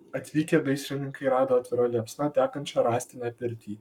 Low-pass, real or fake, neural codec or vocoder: 19.8 kHz; fake; codec, 44.1 kHz, 7.8 kbps, Pupu-Codec